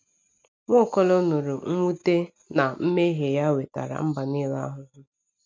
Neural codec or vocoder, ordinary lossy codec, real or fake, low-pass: none; none; real; none